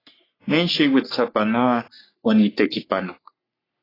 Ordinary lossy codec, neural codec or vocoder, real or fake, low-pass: AAC, 24 kbps; codec, 44.1 kHz, 3.4 kbps, Pupu-Codec; fake; 5.4 kHz